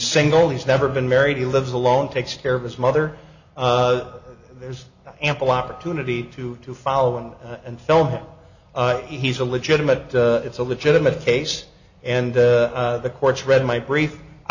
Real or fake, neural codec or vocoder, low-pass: real; none; 7.2 kHz